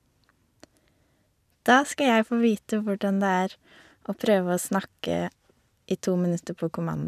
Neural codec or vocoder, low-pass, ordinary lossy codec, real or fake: none; 14.4 kHz; none; real